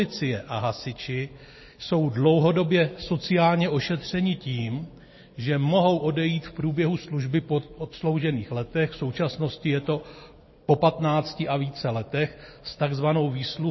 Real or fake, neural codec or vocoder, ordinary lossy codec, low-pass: real; none; MP3, 24 kbps; 7.2 kHz